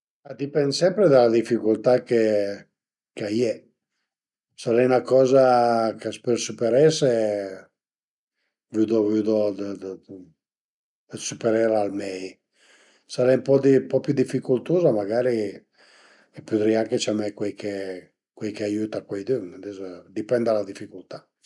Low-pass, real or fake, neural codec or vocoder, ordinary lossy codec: 10.8 kHz; real; none; none